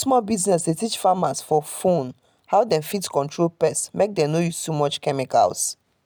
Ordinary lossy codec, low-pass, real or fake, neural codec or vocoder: none; none; real; none